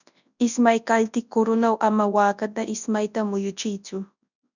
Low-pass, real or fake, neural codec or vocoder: 7.2 kHz; fake; codec, 24 kHz, 0.9 kbps, WavTokenizer, large speech release